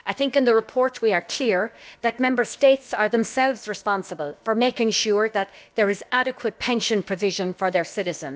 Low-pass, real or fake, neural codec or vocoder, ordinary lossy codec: none; fake; codec, 16 kHz, about 1 kbps, DyCAST, with the encoder's durations; none